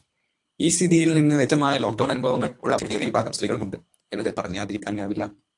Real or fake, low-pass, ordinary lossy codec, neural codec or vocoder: fake; 10.8 kHz; AAC, 64 kbps; codec, 24 kHz, 3 kbps, HILCodec